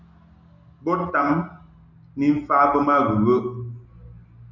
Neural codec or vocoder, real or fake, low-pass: none; real; 7.2 kHz